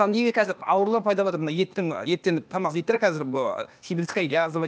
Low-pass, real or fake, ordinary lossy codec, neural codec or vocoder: none; fake; none; codec, 16 kHz, 0.8 kbps, ZipCodec